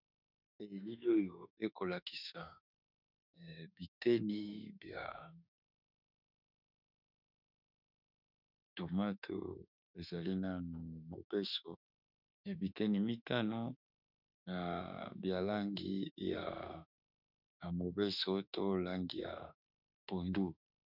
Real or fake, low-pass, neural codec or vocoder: fake; 5.4 kHz; autoencoder, 48 kHz, 32 numbers a frame, DAC-VAE, trained on Japanese speech